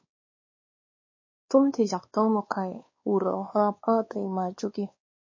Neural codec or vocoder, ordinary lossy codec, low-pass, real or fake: codec, 16 kHz, 2 kbps, X-Codec, WavLM features, trained on Multilingual LibriSpeech; MP3, 32 kbps; 7.2 kHz; fake